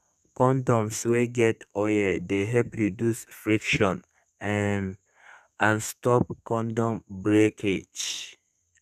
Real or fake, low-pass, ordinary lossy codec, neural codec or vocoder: fake; 14.4 kHz; none; codec, 32 kHz, 1.9 kbps, SNAC